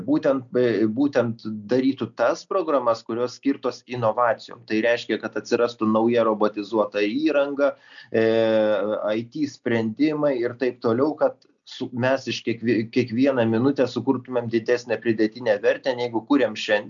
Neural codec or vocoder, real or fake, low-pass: none; real; 7.2 kHz